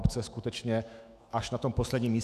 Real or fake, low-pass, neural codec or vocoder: fake; 14.4 kHz; autoencoder, 48 kHz, 128 numbers a frame, DAC-VAE, trained on Japanese speech